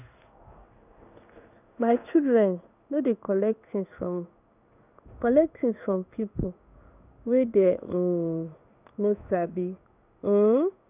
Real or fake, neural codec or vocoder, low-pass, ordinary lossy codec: fake; codec, 16 kHz in and 24 kHz out, 1 kbps, XY-Tokenizer; 3.6 kHz; none